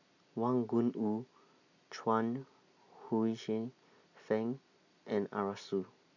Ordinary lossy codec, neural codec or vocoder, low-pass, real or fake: none; none; 7.2 kHz; real